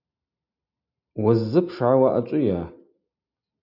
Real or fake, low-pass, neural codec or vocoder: real; 5.4 kHz; none